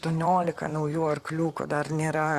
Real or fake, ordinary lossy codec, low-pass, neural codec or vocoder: fake; Opus, 64 kbps; 14.4 kHz; vocoder, 44.1 kHz, 128 mel bands, Pupu-Vocoder